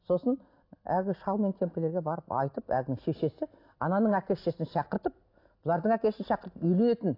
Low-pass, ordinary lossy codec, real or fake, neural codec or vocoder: 5.4 kHz; AAC, 32 kbps; real; none